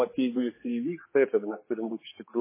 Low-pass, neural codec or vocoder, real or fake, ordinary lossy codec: 3.6 kHz; codec, 16 kHz, 4 kbps, X-Codec, HuBERT features, trained on general audio; fake; MP3, 16 kbps